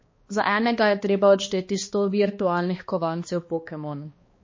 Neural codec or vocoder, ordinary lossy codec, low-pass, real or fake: codec, 16 kHz, 2 kbps, X-Codec, HuBERT features, trained on balanced general audio; MP3, 32 kbps; 7.2 kHz; fake